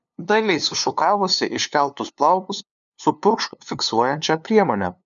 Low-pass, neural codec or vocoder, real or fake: 7.2 kHz; codec, 16 kHz, 2 kbps, FunCodec, trained on LibriTTS, 25 frames a second; fake